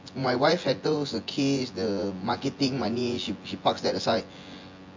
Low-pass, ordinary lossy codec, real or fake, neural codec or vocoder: 7.2 kHz; MP3, 48 kbps; fake; vocoder, 24 kHz, 100 mel bands, Vocos